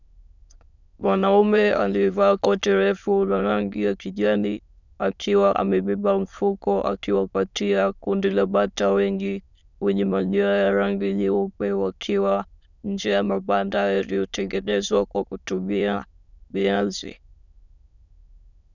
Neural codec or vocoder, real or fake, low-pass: autoencoder, 22.05 kHz, a latent of 192 numbers a frame, VITS, trained on many speakers; fake; 7.2 kHz